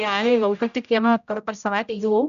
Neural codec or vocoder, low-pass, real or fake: codec, 16 kHz, 0.5 kbps, X-Codec, HuBERT features, trained on general audio; 7.2 kHz; fake